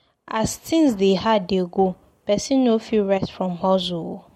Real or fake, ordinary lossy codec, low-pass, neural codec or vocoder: real; MP3, 64 kbps; 19.8 kHz; none